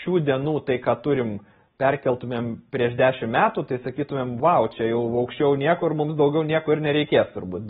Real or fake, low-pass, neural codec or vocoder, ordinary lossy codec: real; 7.2 kHz; none; AAC, 16 kbps